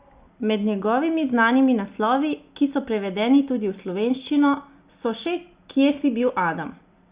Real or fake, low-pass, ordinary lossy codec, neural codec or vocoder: real; 3.6 kHz; Opus, 64 kbps; none